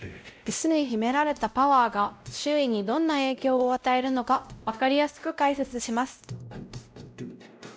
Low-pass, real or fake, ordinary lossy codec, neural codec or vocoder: none; fake; none; codec, 16 kHz, 0.5 kbps, X-Codec, WavLM features, trained on Multilingual LibriSpeech